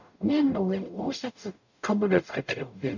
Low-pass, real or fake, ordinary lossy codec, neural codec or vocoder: 7.2 kHz; fake; none; codec, 44.1 kHz, 0.9 kbps, DAC